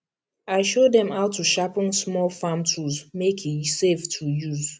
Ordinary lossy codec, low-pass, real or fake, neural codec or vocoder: none; none; real; none